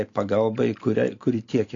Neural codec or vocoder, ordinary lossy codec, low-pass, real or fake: none; AAC, 48 kbps; 7.2 kHz; real